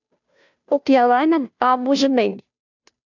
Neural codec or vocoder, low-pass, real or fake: codec, 16 kHz, 0.5 kbps, FunCodec, trained on Chinese and English, 25 frames a second; 7.2 kHz; fake